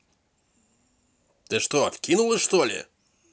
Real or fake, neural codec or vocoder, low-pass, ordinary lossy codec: real; none; none; none